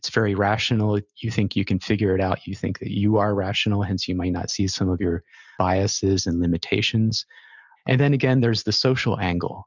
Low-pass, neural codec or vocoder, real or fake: 7.2 kHz; none; real